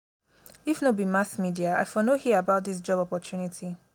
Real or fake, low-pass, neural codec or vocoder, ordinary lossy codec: real; none; none; none